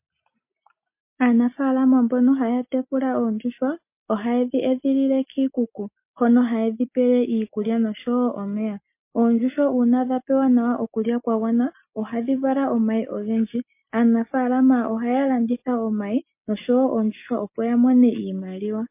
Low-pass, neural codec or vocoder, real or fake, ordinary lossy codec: 3.6 kHz; none; real; MP3, 24 kbps